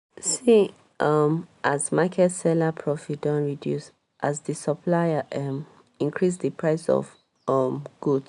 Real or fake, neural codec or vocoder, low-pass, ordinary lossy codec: real; none; 10.8 kHz; none